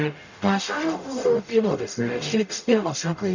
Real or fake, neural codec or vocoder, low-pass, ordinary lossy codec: fake; codec, 44.1 kHz, 0.9 kbps, DAC; 7.2 kHz; none